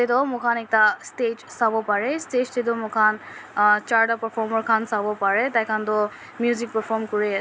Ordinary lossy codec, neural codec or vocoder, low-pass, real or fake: none; none; none; real